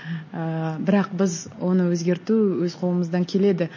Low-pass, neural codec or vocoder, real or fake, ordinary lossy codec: 7.2 kHz; none; real; MP3, 32 kbps